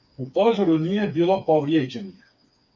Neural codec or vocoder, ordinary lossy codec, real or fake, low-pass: codec, 16 kHz, 4 kbps, FreqCodec, smaller model; MP3, 48 kbps; fake; 7.2 kHz